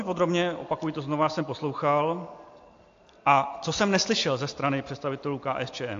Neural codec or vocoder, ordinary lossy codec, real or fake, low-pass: none; AAC, 48 kbps; real; 7.2 kHz